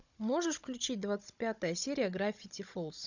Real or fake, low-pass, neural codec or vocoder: fake; 7.2 kHz; codec, 16 kHz, 16 kbps, FunCodec, trained on Chinese and English, 50 frames a second